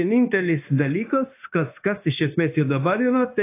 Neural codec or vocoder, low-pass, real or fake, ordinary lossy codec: codec, 16 kHz, 0.9 kbps, LongCat-Audio-Codec; 3.6 kHz; fake; AAC, 24 kbps